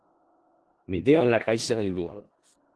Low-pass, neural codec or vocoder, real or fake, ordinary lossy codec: 10.8 kHz; codec, 16 kHz in and 24 kHz out, 0.4 kbps, LongCat-Audio-Codec, four codebook decoder; fake; Opus, 16 kbps